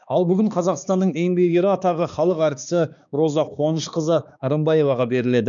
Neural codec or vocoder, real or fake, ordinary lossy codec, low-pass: codec, 16 kHz, 2 kbps, X-Codec, HuBERT features, trained on balanced general audio; fake; none; 7.2 kHz